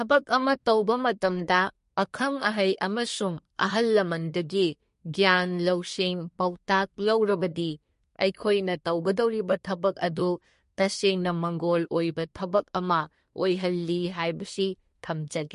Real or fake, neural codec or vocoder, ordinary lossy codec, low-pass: fake; codec, 24 kHz, 1 kbps, SNAC; MP3, 48 kbps; 10.8 kHz